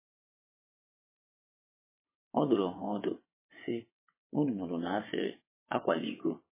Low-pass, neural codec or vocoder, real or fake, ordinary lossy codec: 3.6 kHz; vocoder, 22.05 kHz, 80 mel bands, WaveNeXt; fake; MP3, 16 kbps